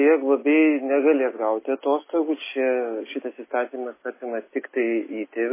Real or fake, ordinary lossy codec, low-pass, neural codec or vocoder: real; MP3, 16 kbps; 3.6 kHz; none